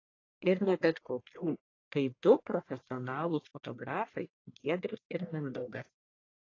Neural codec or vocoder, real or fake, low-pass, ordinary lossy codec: codec, 44.1 kHz, 1.7 kbps, Pupu-Codec; fake; 7.2 kHz; AAC, 32 kbps